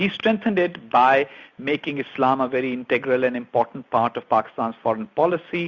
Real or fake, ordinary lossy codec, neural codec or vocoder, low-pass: real; Opus, 64 kbps; none; 7.2 kHz